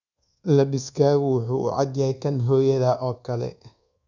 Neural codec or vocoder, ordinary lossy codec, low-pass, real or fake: codec, 24 kHz, 1.2 kbps, DualCodec; none; 7.2 kHz; fake